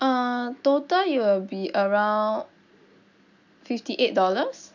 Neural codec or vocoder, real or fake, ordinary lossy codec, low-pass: none; real; none; 7.2 kHz